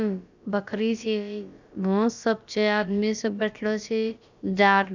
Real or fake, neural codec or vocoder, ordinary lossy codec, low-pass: fake; codec, 16 kHz, about 1 kbps, DyCAST, with the encoder's durations; none; 7.2 kHz